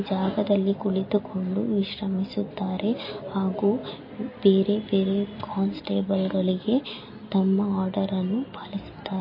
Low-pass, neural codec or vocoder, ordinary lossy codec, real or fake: 5.4 kHz; none; MP3, 24 kbps; real